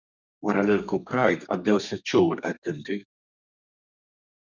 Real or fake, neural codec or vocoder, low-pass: fake; codec, 32 kHz, 1.9 kbps, SNAC; 7.2 kHz